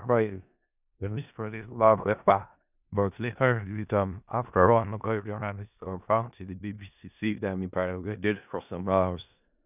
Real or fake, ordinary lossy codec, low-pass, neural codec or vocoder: fake; none; 3.6 kHz; codec, 16 kHz in and 24 kHz out, 0.4 kbps, LongCat-Audio-Codec, four codebook decoder